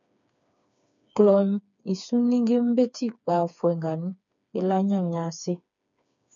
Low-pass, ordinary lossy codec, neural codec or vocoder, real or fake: 7.2 kHz; MP3, 96 kbps; codec, 16 kHz, 4 kbps, FreqCodec, smaller model; fake